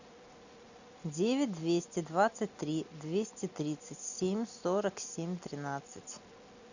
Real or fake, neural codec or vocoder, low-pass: real; none; 7.2 kHz